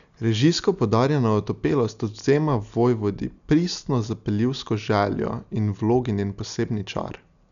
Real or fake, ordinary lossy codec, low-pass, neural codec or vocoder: real; none; 7.2 kHz; none